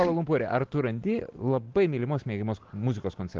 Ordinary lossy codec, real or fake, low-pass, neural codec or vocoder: Opus, 16 kbps; real; 7.2 kHz; none